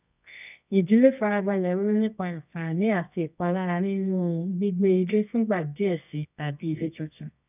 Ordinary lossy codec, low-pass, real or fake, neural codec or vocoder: none; 3.6 kHz; fake; codec, 24 kHz, 0.9 kbps, WavTokenizer, medium music audio release